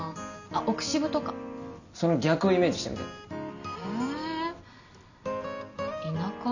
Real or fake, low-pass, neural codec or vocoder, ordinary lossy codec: real; 7.2 kHz; none; none